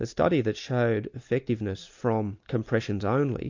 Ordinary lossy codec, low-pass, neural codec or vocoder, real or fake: MP3, 48 kbps; 7.2 kHz; none; real